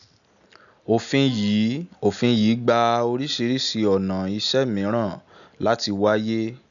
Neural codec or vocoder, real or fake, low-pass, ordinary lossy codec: none; real; 7.2 kHz; none